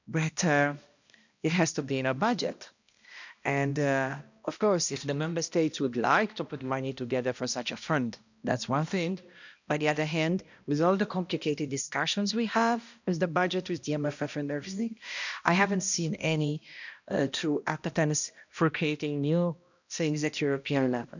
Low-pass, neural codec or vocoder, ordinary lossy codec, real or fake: 7.2 kHz; codec, 16 kHz, 1 kbps, X-Codec, HuBERT features, trained on balanced general audio; none; fake